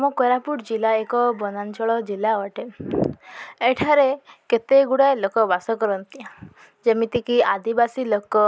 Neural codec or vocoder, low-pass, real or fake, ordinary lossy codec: none; none; real; none